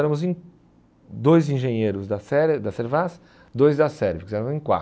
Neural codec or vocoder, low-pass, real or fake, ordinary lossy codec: none; none; real; none